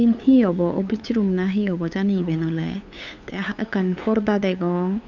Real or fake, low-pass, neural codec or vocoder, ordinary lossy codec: fake; 7.2 kHz; codec, 16 kHz, 8 kbps, FunCodec, trained on LibriTTS, 25 frames a second; none